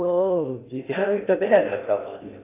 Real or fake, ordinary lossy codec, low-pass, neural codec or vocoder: fake; AAC, 24 kbps; 3.6 kHz; codec, 16 kHz in and 24 kHz out, 0.6 kbps, FocalCodec, streaming, 2048 codes